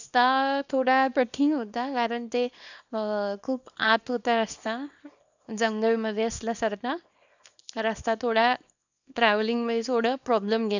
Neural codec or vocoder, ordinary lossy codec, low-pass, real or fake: codec, 24 kHz, 0.9 kbps, WavTokenizer, small release; none; 7.2 kHz; fake